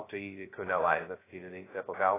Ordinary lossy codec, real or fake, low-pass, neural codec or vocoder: AAC, 16 kbps; fake; 3.6 kHz; codec, 16 kHz, 0.2 kbps, FocalCodec